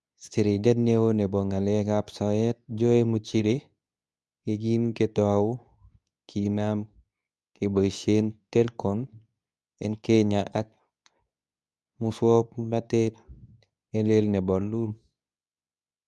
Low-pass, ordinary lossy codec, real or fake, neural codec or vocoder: none; none; fake; codec, 24 kHz, 0.9 kbps, WavTokenizer, medium speech release version 2